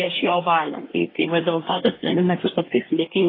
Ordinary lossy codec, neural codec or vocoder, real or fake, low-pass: AAC, 24 kbps; codec, 24 kHz, 1 kbps, SNAC; fake; 5.4 kHz